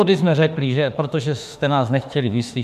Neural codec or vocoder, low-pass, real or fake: autoencoder, 48 kHz, 32 numbers a frame, DAC-VAE, trained on Japanese speech; 14.4 kHz; fake